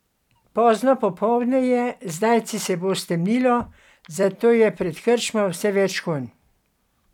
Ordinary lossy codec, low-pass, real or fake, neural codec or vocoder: none; 19.8 kHz; fake; vocoder, 44.1 kHz, 128 mel bands every 256 samples, BigVGAN v2